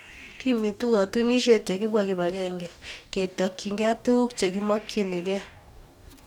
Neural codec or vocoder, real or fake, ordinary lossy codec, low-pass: codec, 44.1 kHz, 2.6 kbps, DAC; fake; none; 19.8 kHz